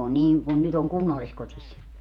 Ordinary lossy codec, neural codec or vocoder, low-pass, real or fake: none; vocoder, 48 kHz, 128 mel bands, Vocos; 19.8 kHz; fake